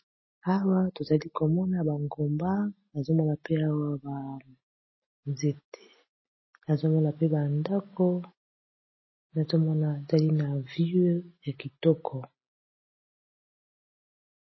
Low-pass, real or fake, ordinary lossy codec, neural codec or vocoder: 7.2 kHz; real; MP3, 24 kbps; none